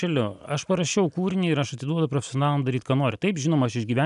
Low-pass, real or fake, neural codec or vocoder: 10.8 kHz; real; none